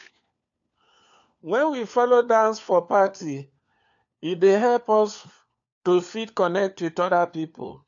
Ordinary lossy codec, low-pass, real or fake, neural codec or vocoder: AAC, 64 kbps; 7.2 kHz; fake; codec, 16 kHz, 4 kbps, FunCodec, trained on LibriTTS, 50 frames a second